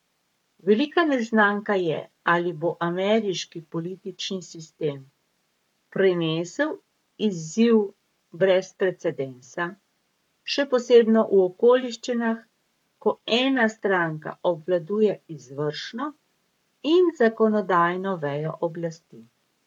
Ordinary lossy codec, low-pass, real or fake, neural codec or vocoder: MP3, 96 kbps; 19.8 kHz; fake; codec, 44.1 kHz, 7.8 kbps, Pupu-Codec